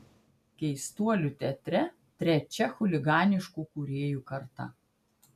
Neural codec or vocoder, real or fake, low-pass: none; real; 14.4 kHz